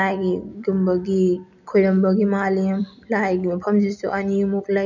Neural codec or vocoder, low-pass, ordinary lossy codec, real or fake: none; 7.2 kHz; none; real